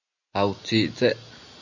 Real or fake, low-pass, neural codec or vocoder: real; 7.2 kHz; none